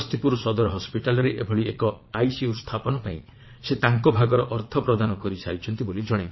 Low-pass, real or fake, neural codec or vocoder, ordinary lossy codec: 7.2 kHz; fake; vocoder, 22.05 kHz, 80 mel bands, Vocos; MP3, 24 kbps